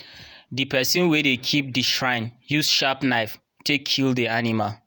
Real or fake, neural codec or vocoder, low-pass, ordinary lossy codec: fake; vocoder, 48 kHz, 128 mel bands, Vocos; none; none